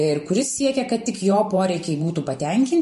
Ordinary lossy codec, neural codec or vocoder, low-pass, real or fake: MP3, 48 kbps; vocoder, 44.1 kHz, 128 mel bands every 512 samples, BigVGAN v2; 14.4 kHz; fake